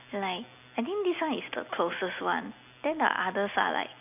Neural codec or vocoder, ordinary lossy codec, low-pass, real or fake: none; none; 3.6 kHz; real